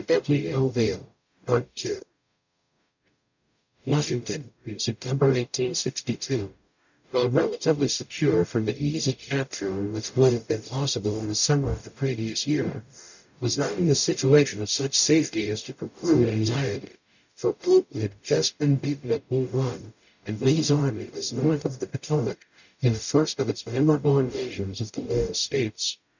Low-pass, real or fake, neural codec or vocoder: 7.2 kHz; fake; codec, 44.1 kHz, 0.9 kbps, DAC